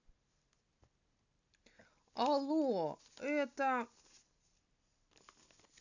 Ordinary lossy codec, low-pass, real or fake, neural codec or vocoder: none; 7.2 kHz; real; none